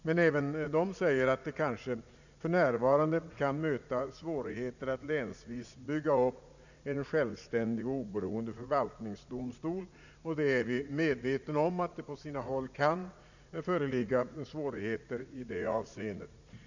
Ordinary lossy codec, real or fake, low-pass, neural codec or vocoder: none; fake; 7.2 kHz; vocoder, 44.1 kHz, 80 mel bands, Vocos